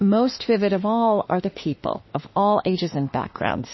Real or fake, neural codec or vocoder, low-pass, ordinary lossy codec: fake; autoencoder, 48 kHz, 32 numbers a frame, DAC-VAE, trained on Japanese speech; 7.2 kHz; MP3, 24 kbps